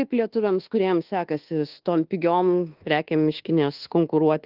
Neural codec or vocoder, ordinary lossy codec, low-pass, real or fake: codec, 24 kHz, 1.2 kbps, DualCodec; Opus, 16 kbps; 5.4 kHz; fake